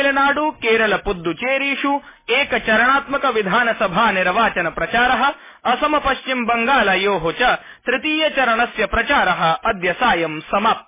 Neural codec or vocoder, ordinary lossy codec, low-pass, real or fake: none; MP3, 16 kbps; 3.6 kHz; real